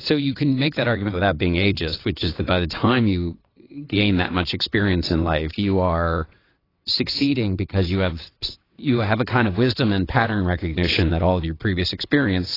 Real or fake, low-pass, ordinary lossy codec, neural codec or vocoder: fake; 5.4 kHz; AAC, 24 kbps; vocoder, 22.05 kHz, 80 mel bands, WaveNeXt